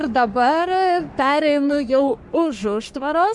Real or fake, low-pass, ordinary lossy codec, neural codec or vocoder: fake; 10.8 kHz; MP3, 96 kbps; codec, 44.1 kHz, 3.4 kbps, Pupu-Codec